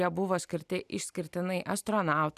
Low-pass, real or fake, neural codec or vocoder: 14.4 kHz; real; none